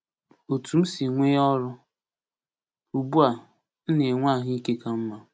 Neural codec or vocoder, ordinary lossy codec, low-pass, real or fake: none; none; none; real